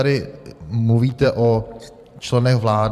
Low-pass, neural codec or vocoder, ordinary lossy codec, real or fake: 14.4 kHz; vocoder, 44.1 kHz, 128 mel bands every 512 samples, BigVGAN v2; MP3, 96 kbps; fake